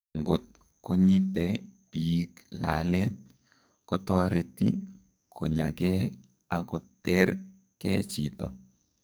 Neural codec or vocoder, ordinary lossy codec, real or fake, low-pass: codec, 44.1 kHz, 2.6 kbps, SNAC; none; fake; none